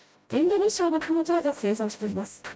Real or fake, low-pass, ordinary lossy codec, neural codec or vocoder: fake; none; none; codec, 16 kHz, 0.5 kbps, FreqCodec, smaller model